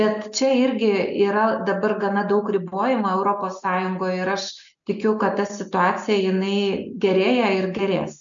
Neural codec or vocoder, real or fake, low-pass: none; real; 7.2 kHz